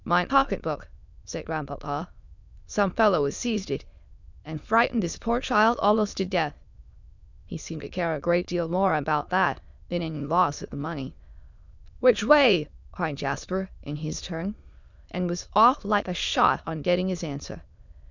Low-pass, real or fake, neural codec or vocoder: 7.2 kHz; fake; autoencoder, 22.05 kHz, a latent of 192 numbers a frame, VITS, trained on many speakers